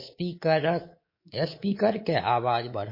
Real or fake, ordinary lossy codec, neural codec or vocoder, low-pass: fake; MP3, 24 kbps; codec, 24 kHz, 3.1 kbps, DualCodec; 5.4 kHz